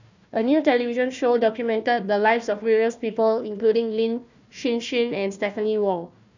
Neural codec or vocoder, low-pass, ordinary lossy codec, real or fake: codec, 16 kHz, 1 kbps, FunCodec, trained on Chinese and English, 50 frames a second; 7.2 kHz; none; fake